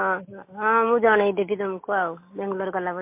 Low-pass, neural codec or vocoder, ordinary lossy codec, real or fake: 3.6 kHz; none; MP3, 24 kbps; real